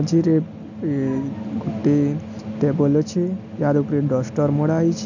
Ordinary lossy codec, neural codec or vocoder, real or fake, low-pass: none; none; real; 7.2 kHz